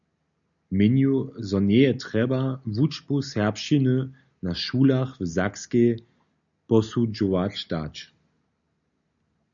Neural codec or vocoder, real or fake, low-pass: none; real; 7.2 kHz